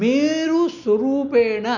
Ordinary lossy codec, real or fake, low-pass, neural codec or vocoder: none; real; 7.2 kHz; none